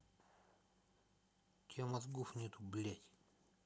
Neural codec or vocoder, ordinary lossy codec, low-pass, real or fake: none; none; none; real